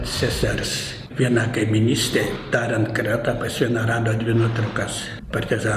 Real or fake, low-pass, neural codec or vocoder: real; 14.4 kHz; none